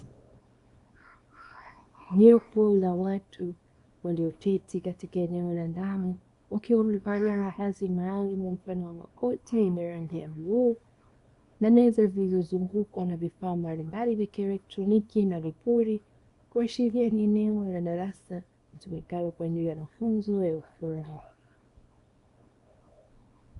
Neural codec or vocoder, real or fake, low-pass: codec, 24 kHz, 0.9 kbps, WavTokenizer, small release; fake; 10.8 kHz